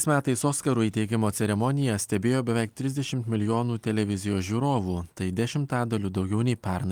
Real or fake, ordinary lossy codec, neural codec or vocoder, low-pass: real; Opus, 32 kbps; none; 19.8 kHz